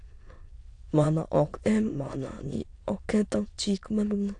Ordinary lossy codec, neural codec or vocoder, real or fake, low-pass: AAC, 48 kbps; autoencoder, 22.05 kHz, a latent of 192 numbers a frame, VITS, trained on many speakers; fake; 9.9 kHz